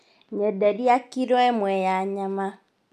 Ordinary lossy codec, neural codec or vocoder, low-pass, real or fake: none; none; 14.4 kHz; real